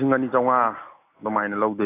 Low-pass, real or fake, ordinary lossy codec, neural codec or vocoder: 3.6 kHz; real; AAC, 24 kbps; none